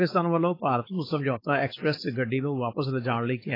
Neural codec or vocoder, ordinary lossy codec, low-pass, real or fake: codec, 16 kHz, 4.8 kbps, FACodec; AAC, 24 kbps; 5.4 kHz; fake